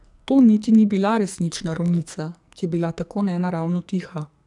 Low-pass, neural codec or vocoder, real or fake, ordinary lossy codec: 10.8 kHz; codec, 44.1 kHz, 2.6 kbps, SNAC; fake; none